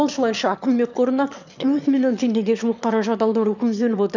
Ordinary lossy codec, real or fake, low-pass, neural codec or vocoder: none; fake; 7.2 kHz; autoencoder, 22.05 kHz, a latent of 192 numbers a frame, VITS, trained on one speaker